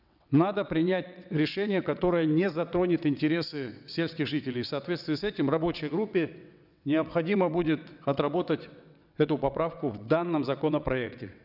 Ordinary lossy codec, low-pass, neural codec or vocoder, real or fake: none; 5.4 kHz; vocoder, 22.05 kHz, 80 mel bands, WaveNeXt; fake